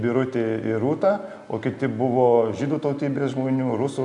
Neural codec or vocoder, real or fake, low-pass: none; real; 10.8 kHz